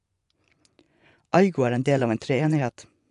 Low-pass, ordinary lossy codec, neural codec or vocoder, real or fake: 10.8 kHz; none; vocoder, 24 kHz, 100 mel bands, Vocos; fake